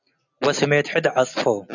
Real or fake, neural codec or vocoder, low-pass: real; none; 7.2 kHz